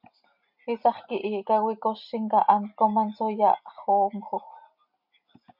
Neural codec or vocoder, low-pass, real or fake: none; 5.4 kHz; real